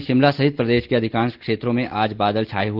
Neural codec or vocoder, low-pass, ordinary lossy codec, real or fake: none; 5.4 kHz; Opus, 16 kbps; real